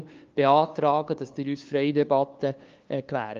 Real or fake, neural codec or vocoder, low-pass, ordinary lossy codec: fake; codec, 16 kHz, 2 kbps, FunCodec, trained on LibriTTS, 25 frames a second; 7.2 kHz; Opus, 32 kbps